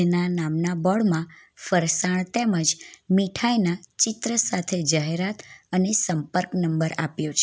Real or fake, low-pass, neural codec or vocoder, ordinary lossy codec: real; none; none; none